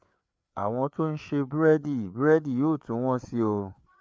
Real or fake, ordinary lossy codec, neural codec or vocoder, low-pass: fake; none; codec, 16 kHz, 4 kbps, FreqCodec, larger model; none